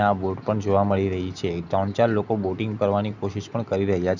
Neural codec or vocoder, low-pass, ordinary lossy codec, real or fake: none; 7.2 kHz; none; real